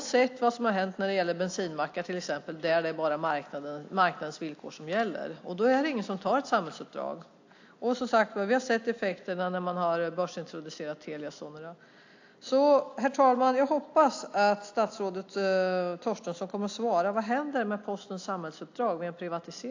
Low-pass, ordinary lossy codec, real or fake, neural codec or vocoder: 7.2 kHz; AAC, 48 kbps; real; none